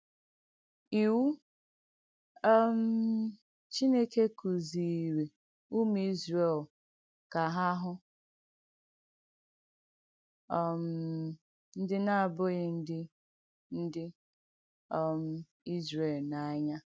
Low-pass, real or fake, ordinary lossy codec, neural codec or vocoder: none; real; none; none